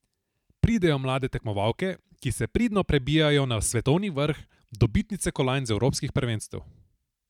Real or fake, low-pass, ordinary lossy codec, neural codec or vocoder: fake; 19.8 kHz; none; vocoder, 44.1 kHz, 128 mel bands every 512 samples, BigVGAN v2